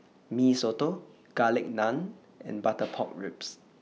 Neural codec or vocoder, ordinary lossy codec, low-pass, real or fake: none; none; none; real